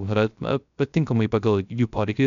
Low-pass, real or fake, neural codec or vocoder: 7.2 kHz; fake; codec, 16 kHz, 0.3 kbps, FocalCodec